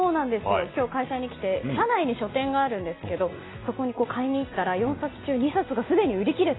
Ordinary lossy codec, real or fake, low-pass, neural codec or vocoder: AAC, 16 kbps; real; 7.2 kHz; none